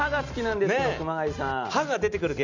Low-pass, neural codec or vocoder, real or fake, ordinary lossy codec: 7.2 kHz; none; real; none